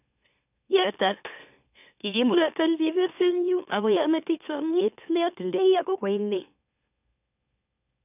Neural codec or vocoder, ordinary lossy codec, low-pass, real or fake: autoencoder, 44.1 kHz, a latent of 192 numbers a frame, MeloTTS; none; 3.6 kHz; fake